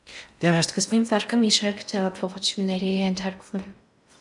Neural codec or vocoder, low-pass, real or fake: codec, 16 kHz in and 24 kHz out, 0.6 kbps, FocalCodec, streaming, 4096 codes; 10.8 kHz; fake